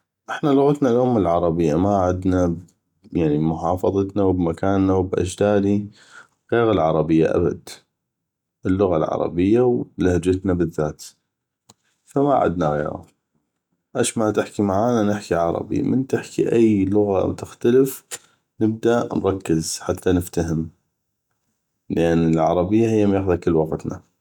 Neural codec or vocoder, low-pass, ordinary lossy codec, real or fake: none; 19.8 kHz; none; real